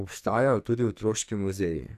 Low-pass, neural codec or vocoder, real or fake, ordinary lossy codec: 14.4 kHz; codec, 44.1 kHz, 2.6 kbps, SNAC; fake; none